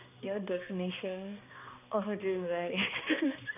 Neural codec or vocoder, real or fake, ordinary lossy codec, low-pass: codec, 16 kHz, 2 kbps, X-Codec, HuBERT features, trained on balanced general audio; fake; none; 3.6 kHz